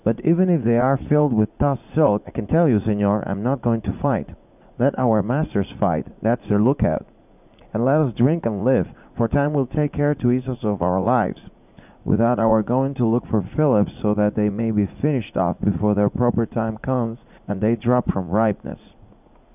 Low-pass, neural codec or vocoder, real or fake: 3.6 kHz; vocoder, 44.1 kHz, 80 mel bands, Vocos; fake